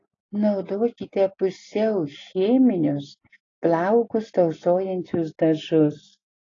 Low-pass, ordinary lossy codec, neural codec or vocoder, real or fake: 7.2 kHz; AAC, 32 kbps; none; real